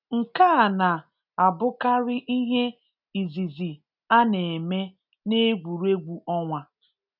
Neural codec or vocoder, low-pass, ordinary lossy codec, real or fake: none; 5.4 kHz; none; real